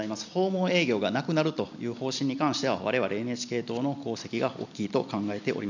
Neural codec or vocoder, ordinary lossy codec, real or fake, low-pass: none; none; real; 7.2 kHz